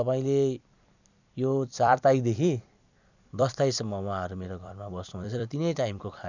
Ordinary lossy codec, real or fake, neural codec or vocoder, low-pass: none; fake; vocoder, 44.1 kHz, 128 mel bands every 256 samples, BigVGAN v2; 7.2 kHz